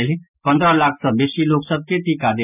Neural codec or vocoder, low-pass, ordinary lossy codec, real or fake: none; 3.6 kHz; none; real